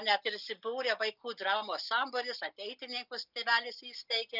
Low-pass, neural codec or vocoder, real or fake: 5.4 kHz; none; real